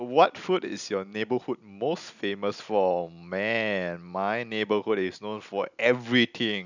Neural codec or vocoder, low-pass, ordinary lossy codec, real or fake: none; 7.2 kHz; none; real